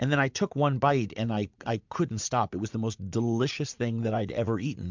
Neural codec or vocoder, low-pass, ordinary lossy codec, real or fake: vocoder, 44.1 kHz, 80 mel bands, Vocos; 7.2 kHz; MP3, 64 kbps; fake